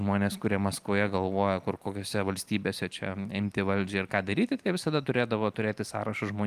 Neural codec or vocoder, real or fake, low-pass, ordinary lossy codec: none; real; 14.4 kHz; Opus, 32 kbps